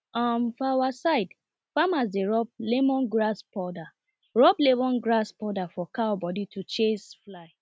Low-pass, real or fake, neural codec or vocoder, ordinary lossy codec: none; real; none; none